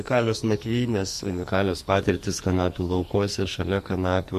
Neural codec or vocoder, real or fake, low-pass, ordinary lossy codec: codec, 44.1 kHz, 2.6 kbps, SNAC; fake; 14.4 kHz; MP3, 64 kbps